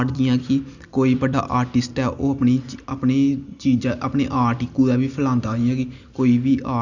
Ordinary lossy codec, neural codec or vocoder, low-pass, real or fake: none; none; 7.2 kHz; real